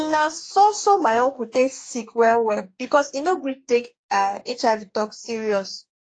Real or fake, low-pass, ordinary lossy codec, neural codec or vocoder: fake; 9.9 kHz; AAC, 48 kbps; codec, 44.1 kHz, 2.6 kbps, DAC